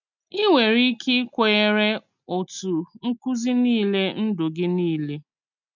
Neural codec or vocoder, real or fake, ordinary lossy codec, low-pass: none; real; none; 7.2 kHz